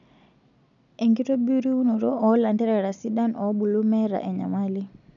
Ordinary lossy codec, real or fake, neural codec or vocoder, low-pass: none; real; none; 7.2 kHz